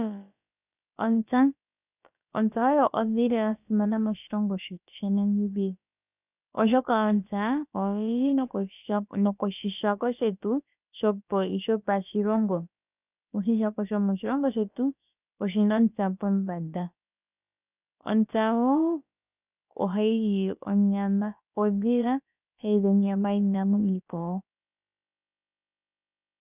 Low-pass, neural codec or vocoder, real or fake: 3.6 kHz; codec, 16 kHz, about 1 kbps, DyCAST, with the encoder's durations; fake